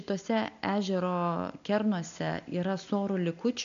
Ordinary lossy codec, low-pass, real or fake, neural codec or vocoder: MP3, 96 kbps; 7.2 kHz; real; none